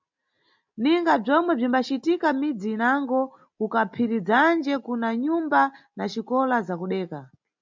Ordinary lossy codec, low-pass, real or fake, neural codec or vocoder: MP3, 64 kbps; 7.2 kHz; real; none